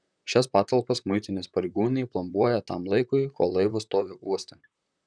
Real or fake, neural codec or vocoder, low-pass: fake; vocoder, 44.1 kHz, 128 mel bands, Pupu-Vocoder; 9.9 kHz